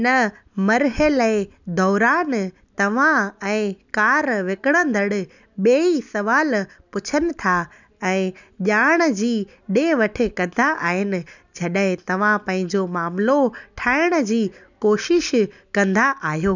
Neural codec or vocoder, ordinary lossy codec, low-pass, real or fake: none; none; 7.2 kHz; real